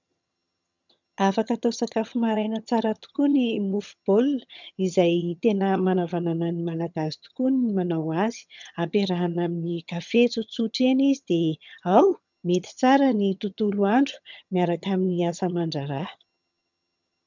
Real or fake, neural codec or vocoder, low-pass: fake; vocoder, 22.05 kHz, 80 mel bands, HiFi-GAN; 7.2 kHz